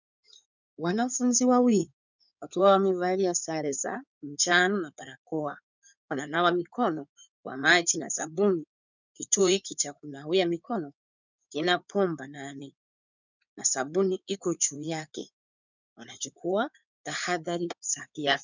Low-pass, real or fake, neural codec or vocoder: 7.2 kHz; fake; codec, 16 kHz in and 24 kHz out, 2.2 kbps, FireRedTTS-2 codec